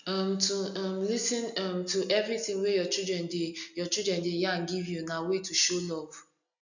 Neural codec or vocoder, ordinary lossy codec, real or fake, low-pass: none; none; real; 7.2 kHz